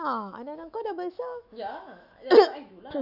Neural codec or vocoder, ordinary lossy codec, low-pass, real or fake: autoencoder, 48 kHz, 128 numbers a frame, DAC-VAE, trained on Japanese speech; none; 5.4 kHz; fake